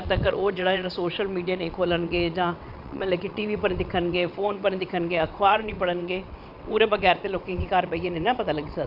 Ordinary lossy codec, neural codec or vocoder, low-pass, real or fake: none; vocoder, 22.05 kHz, 80 mel bands, WaveNeXt; 5.4 kHz; fake